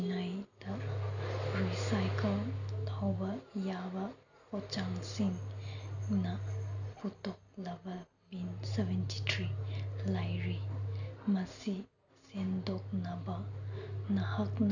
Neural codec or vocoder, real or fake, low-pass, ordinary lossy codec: none; real; 7.2 kHz; none